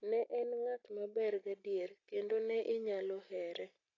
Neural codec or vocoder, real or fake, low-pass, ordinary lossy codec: none; real; 7.2 kHz; AAC, 32 kbps